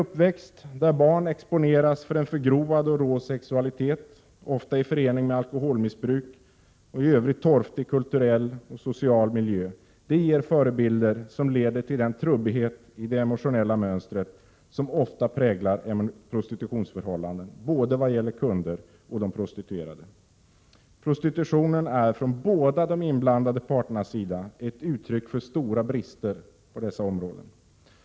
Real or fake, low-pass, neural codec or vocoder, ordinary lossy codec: real; none; none; none